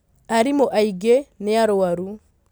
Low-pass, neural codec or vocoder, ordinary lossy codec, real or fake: none; none; none; real